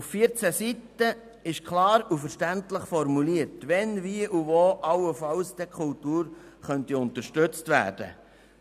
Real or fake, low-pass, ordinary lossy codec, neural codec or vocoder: real; 14.4 kHz; none; none